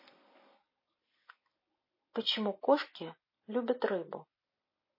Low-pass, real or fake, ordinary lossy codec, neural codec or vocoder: 5.4 kHz; real; MP3, 24 kbps; none